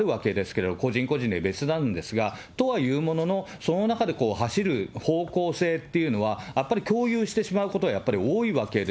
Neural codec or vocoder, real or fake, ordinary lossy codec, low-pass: none; real; none; none